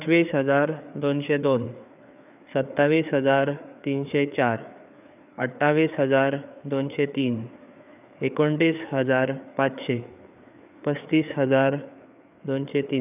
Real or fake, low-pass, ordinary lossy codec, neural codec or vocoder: fake; 3.6 kHz; none; codec, 16 kHz, 4 kbps, FreqCodec, larger model